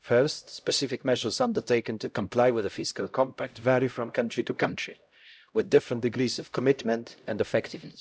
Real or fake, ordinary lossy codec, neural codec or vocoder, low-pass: fake; none; codec, 16 kHz, 0.5 kbps, X-Codec, HuBERT features, trained on LibriSpeech; none